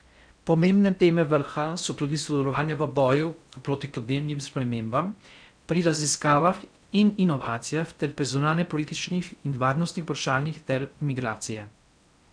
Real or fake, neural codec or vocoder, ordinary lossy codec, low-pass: fake; codec, 16 kHz in and 24 kHz out, 0.6 kbps, FocalCodec, streaming, 4096 codes; none; 9.9 kHz